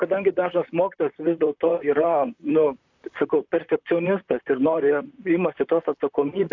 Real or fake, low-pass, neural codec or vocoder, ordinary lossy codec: fake; 7.2 kHz; vocoder, 44.1 kHz, 128 mel bands, Pupu-Vocoder; AAC, 48 kbps